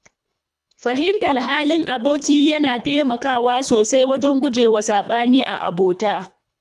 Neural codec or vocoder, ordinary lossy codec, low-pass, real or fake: codec, 24 kHz, 1.5 kbps, HILCodec; none; none; fake